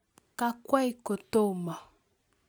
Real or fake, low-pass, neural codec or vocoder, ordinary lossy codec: real; none; none; none